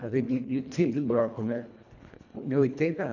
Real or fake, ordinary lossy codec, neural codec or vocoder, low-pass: fake; none; codec, 24 kHz, 1.5 kbps, HILCodec; 7.2 kHz